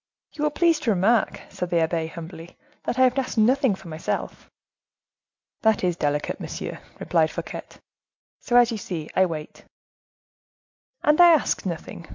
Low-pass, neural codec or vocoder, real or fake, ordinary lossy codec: 7.2 kHz; none; real; MP3, 64 kbps